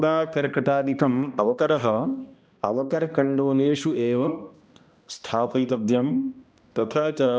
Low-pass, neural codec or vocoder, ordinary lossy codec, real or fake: none; codec, 16 kHz, 1 kbps, X-Codec, HuBERT features, trained on balanced general audio; none; fake